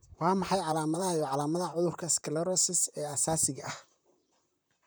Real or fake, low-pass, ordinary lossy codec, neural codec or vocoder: fake; none; none; vocoder, 44.1 kHz, 128 mel bands, Pupu-Vocoder